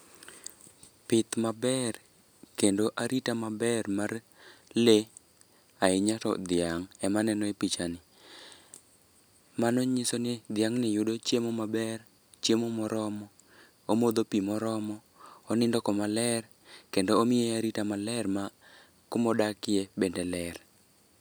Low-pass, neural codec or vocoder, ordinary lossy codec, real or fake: none; none; none; real